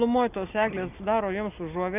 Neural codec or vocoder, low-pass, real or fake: none; 3.6 kHz; real